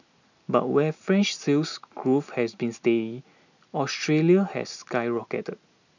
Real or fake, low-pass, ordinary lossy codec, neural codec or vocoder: real; 7.2 kHz; none; none